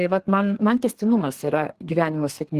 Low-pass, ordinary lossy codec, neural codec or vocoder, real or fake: 14.4 kHz; Opus, 16 kbps; codec, 32 kHz, 1.9 kbps, SNAC; fake